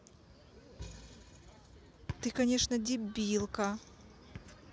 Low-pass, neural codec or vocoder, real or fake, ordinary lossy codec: none; none; real; none